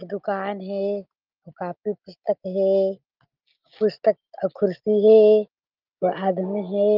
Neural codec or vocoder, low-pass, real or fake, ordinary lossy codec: none; 5.4 kHz; real; Opus, 24 kbps